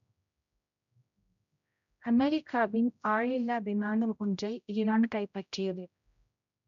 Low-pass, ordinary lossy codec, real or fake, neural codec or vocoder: 7.2 kHz; none; fake; codec, 16 kHz, 0.5 kbps, X-Codec, HuBERT features, trained on general audio